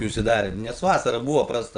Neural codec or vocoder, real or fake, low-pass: vocoder, 22.05 kHz, 80 mel bands, WaveNeXt; fake; 9.9 kHz